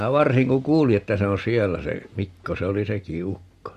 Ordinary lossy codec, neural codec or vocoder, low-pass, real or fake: MP3, 64 kbps; none; 14.4 kHz; real